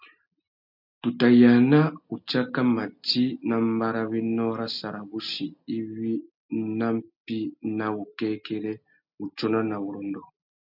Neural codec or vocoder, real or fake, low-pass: none; real; 5.4 kHz